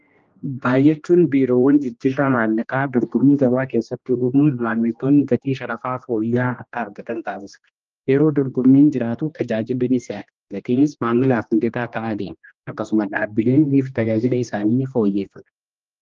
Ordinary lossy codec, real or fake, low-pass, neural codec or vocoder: Opus, 24 kbps; fake; 7.2 kHz; codec, 16 kHz, 1 kbps, X-Codec, HuBERT features, trained on general audio